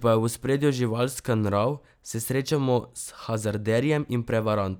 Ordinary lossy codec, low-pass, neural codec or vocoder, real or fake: none; none; none; real